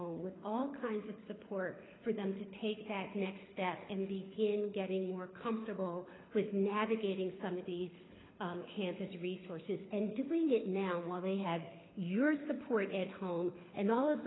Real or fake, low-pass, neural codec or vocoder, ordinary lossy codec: fake; 7.2 kHz; codec, 24 kHz, 6 kbps, HILCodec; AAC, 16 kbps